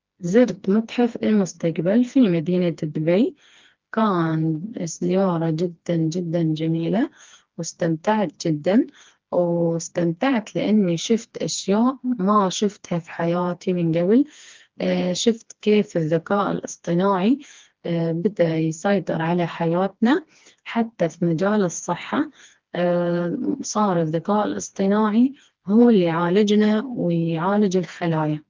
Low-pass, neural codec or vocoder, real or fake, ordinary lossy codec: 7.2 kHz; codec, 16 kHz, 2 kbps, FreqCodec, smaller model; fake; Opus, 32 kbps